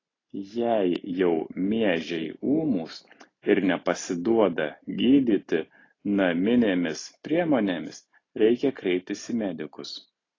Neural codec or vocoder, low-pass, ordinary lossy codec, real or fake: none; 7.2 kHz; AAC, 32 kbps; real